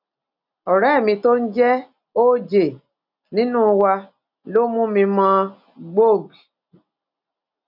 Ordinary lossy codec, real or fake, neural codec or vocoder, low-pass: none; real; none; 5.4 kHz